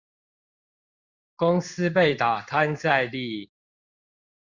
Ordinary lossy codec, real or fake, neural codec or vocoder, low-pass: Opus, 64 kbps; fake; codec, 16 kHz in and 24 kHz out, 1 kbps, XY-Tokenizer; 7.2 kHz